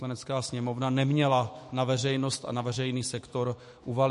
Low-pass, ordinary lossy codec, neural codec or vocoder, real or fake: 14.4 kHz; MP3, 48 kbps; autoencoder, 48 kHz, 128 numbers a frame, DAC-VAE, trained on Japanese speech; fake